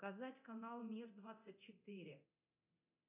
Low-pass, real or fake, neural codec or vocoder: 3.6 kHz; fake; codec, 24 kHz, 0.9 kbps, DualCodec